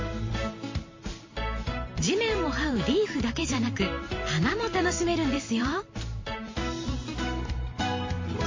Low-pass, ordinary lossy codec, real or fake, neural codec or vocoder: 7.2 kHz; MP3, 32 kbps; fake; vocoder, 44.1 kHz, 128 mel bands every 512 samples, BigVGAN v2